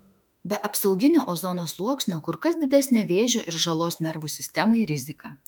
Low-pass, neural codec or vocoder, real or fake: 19.8 kHz; autoencoder, 48 kHz, 32 numbers a frame, DAC-VAE, trained on Japanese speech; fake